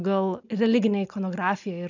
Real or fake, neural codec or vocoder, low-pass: real; none; 7.2 kHz